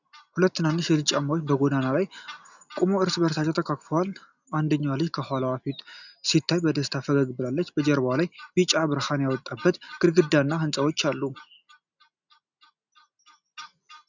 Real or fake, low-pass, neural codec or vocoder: real; 7.2 kHz; none